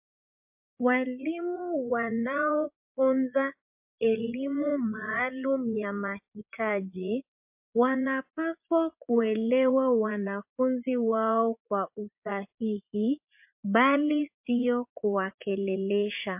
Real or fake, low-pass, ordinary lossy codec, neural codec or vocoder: fake; 3.6 kHz; MP3, 32 kbps; vocoder, 44.1 kHz, 80 mel bands, Vocos